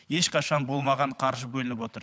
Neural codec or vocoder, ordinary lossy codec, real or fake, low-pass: codec, 16 kHz, 16 kbps, FunCodec, trained on LibriTTS, 50 frames a second; none; fake; none